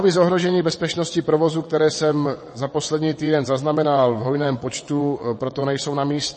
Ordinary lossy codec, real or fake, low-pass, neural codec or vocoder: MP3, 32 kbps; fake; 9.9 kHz; vocoder, 22.05 kHz, 80 mel bands, WaveNeXt